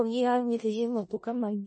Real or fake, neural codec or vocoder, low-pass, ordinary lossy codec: fake; codec, 16 kHz in and 24 kHz out, 0.4 kbps, LongCat-Audio-Codec, four codebook decoder; 10.8 kHz; MP3, 32 kbps